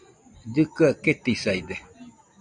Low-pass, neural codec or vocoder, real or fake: 9.9 kHz; none; real